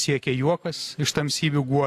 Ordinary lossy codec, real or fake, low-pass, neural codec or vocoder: AAC, 64 kbps; real; 14.4 kHz; none